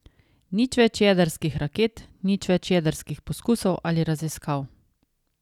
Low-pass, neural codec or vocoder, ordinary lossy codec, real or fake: 19.8 kHz; none; none; real